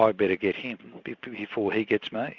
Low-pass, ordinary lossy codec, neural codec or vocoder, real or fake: 7.2 kHz; Opus, 64 kbps; none; real